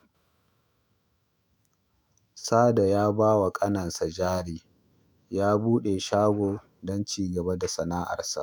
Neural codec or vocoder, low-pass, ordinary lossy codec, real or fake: autoencoder, 48 kHz, 128 numbers a frame, DAC-VAE, trained on Japanese speech; none; none; fake